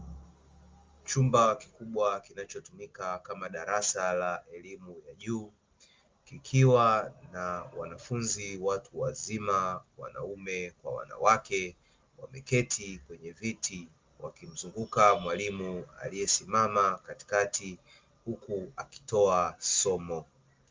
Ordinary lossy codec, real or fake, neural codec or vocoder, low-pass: Opus, 32 kbps; real; none; 7.2 kHz